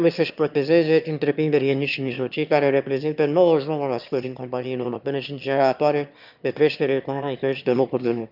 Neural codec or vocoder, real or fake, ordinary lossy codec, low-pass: autoencoder, 22.05 kHz, a latent of 192 numbers a frame, VITS, trained on one speaker; fake; none; 5.4 kHz